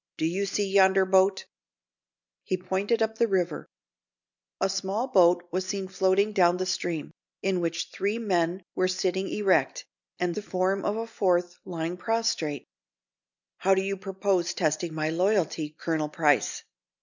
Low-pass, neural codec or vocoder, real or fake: 7.2 kHz; none; real